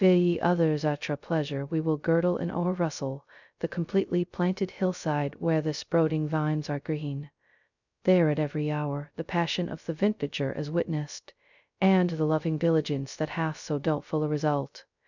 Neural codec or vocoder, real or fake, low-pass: codec, 16 kHz, 0.2 kbps, FocalCodec; fake; 7.2 kHz